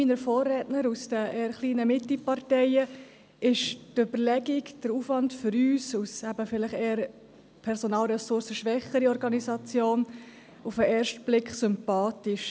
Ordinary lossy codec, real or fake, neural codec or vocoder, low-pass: none; real; none; none